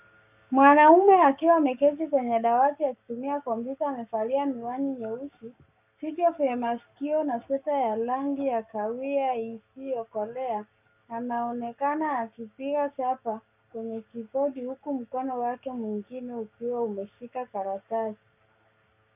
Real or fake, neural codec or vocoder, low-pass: fake; codec, 44.1 kHz, 7.8 kbps, Pupu-Codec; 3.6 kHz